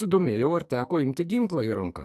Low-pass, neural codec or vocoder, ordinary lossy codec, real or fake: 14.4 kHz; codec, 44.1 kHz, 2.6 kbps, SNAC; AAC, 96 kbps; fake